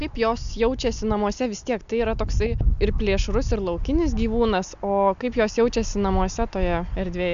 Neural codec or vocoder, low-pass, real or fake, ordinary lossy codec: none; 7.2 kHz; real; AAC, 96 kbps